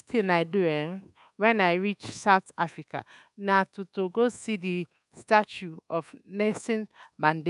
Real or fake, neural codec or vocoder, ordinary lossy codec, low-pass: fake; codec, 24 kHz, 1.2 kbps, DualCodec; none; 10.8 kHz